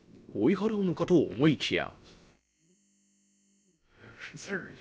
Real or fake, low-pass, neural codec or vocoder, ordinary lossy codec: fake; none; codec, 16 kHz, about 1 kbps, DyCAST, with the encoder's durations; none